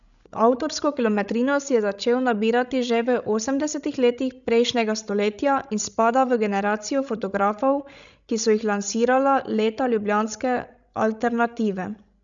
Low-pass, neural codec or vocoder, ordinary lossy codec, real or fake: 7.2 kHz; codec, 16 kHz, 16 kbps, FreqCodec, larger model; none; fake